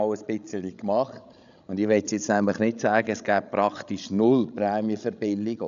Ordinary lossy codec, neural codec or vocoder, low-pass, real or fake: none; codec, 16 kHz, 16 kbps, FreqCodec, larger model; 7.2 kHz; fake